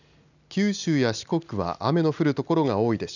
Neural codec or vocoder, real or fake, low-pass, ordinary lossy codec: none; real; 7.2 kHz; none